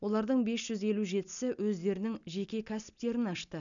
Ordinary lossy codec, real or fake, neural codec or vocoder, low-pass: none; real; none; 7.2 kHz